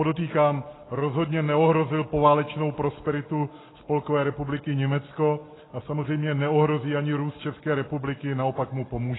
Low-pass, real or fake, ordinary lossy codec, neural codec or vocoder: 7.2 kHz; real; AAC, 16 kbps; none